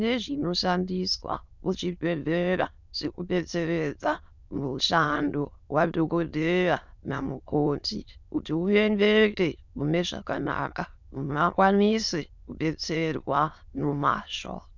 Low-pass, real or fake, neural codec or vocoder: 7.2 kHz; fake; autoencoder, 22.05 kHz, a latent of 192 numbers a frame, VITS, trained on many speakers